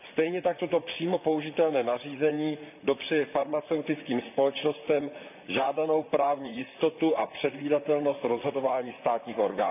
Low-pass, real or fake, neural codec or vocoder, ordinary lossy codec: 3.6 kHz; fake; vocoder, 44.1 kHz, 128 mel bands, Pupu-Vocoder; none